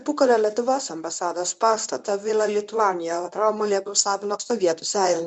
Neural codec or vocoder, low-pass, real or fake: codec, 24 kHz, 0.9 kbps, WavTokenizer, medium speech release version 1; 10.8 kHz; fake